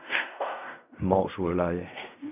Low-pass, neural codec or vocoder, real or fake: 3.6 kHz; codec, 16 kHz in and 24 kHz out, 0.4 kbps, LongCat-Audio-Codec, fine tuned four codebook decoder; fake